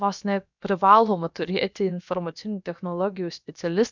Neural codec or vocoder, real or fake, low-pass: codec, 16 kHz, about 1 kbps, DyCAST, with the encoder's durations; fake; 7.2 kHz